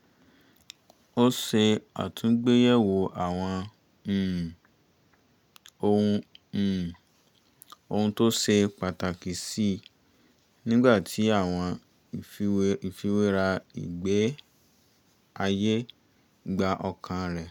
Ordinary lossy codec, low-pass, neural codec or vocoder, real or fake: none; 19.8 kHz; none; real